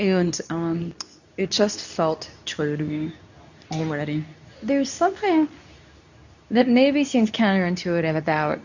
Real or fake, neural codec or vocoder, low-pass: fake; codec, 24 kHz, 0.9 kbps, WavTokenizer, medium speech release version 2; 7.2 kHz